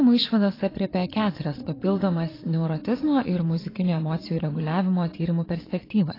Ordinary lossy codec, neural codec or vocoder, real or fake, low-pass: AAC, 24 kbps; vocoder, 22.05 kHz, 80 mel bands, Vocos; fake; 5.4 kHz